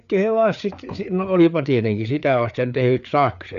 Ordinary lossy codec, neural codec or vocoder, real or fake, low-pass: none; codec, 16 kHz, 4 kbps, FreqCodec, larger model; fake; 7.2 kHz